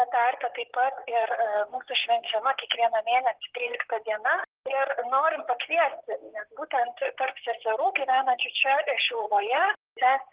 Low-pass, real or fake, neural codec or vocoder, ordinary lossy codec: 3.6 kHz; fake; vocoder, 44.1 kHz, 128 mel bands, Pupu-Vocoder; Opus, 16 kbps